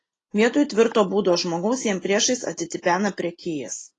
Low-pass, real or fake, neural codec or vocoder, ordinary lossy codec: 10.8 kHz; real; none; AAC, 32 kbps